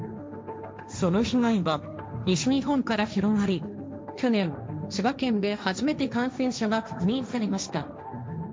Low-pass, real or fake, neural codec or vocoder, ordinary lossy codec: none; fake; codec, 16 kHz, 1.1 kbps, Voila-Tokenizer; none